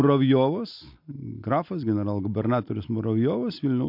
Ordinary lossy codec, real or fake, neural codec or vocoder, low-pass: MP3, 48 kbps; real; none; 5.4 kHz